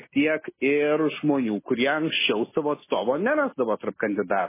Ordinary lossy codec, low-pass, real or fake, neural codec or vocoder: MP3, 16 kbps; 3.6 kHz; real; none